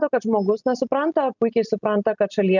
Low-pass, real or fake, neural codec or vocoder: 7.2 kHz; real; none